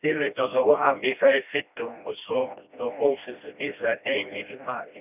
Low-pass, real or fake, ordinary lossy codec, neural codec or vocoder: 3.6 kHz; fake; none; codec, 16 kHz, 1 kbps, FreqCodec, smaller model